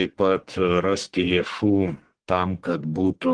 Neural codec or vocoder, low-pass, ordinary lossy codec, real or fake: codec, 44.1 kHz, 1.7 kbps, Pupu-Codec; 9.9 kHz; Opus, 16 kbps; fake